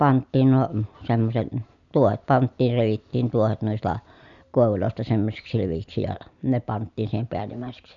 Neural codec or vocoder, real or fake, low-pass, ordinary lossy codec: none; real; 7.2 kHz; none